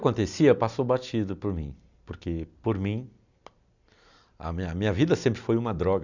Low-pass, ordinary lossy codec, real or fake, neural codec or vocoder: 7.2 kHz; none; real; none